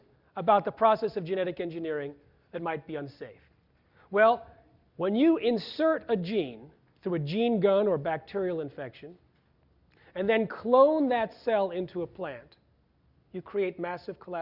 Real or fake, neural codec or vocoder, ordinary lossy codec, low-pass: real; none; Opus, 64 kbps; 5.4 kHz